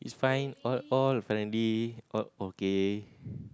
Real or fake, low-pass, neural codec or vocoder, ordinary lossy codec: real; none; none; none